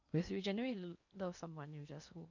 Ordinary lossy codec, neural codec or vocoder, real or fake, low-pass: none; codec, 16 kHz in and 24 kHz out, 0.8 kbps, FocalCodec, streaming, 65536 codes; fake; 7.2 kHz